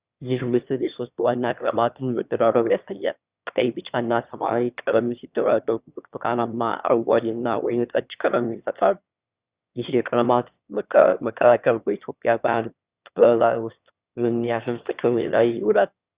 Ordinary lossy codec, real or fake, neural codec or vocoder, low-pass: Opus, 64 kbps; fake; autoencoder, 22.05 kHz, a latent of 192 numbers a frame, VITS, trained on one speaker; 3.6 kHz